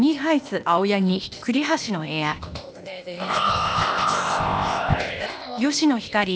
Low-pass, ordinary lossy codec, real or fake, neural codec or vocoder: none; none; fake; codec, 16 kHz, 0.8 kbps, ZipCodec